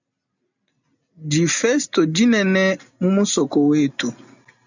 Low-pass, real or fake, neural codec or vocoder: 7.2 kHz; real; none